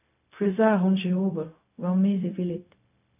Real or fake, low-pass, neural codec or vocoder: fake; 3.6 kHz; codec, 16 kHz, 0.4 kbps, LongCat-Audio-Codec